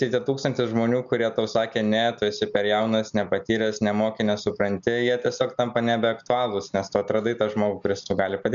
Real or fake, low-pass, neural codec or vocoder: real; 7.2 kHz; none